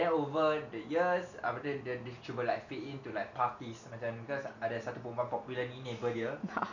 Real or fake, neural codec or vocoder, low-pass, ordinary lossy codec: real; none; 7.2 kHz; none